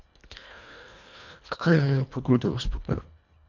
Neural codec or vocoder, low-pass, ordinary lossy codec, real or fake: codec, 24 kHz, 1.5 kbps, HILCodec; 7.2 kHz; none; fake